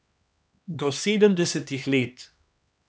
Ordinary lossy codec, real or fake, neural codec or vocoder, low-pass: none; fake; codec, 16 kHz, 2 kbps, X-Codec, HuBERT features, trained on LibriSpeech; none